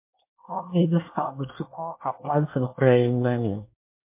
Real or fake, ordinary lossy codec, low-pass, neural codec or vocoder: fake; MP3, 24 kbps; 3.6 kHz; codec, 24 kHz, 1 kbps, SNAC